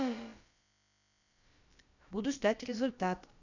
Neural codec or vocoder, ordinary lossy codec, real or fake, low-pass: codec, 16 kHz, about 1 kbps, DyCAST, with the encoder's durations; none; fake; 7.2 kHz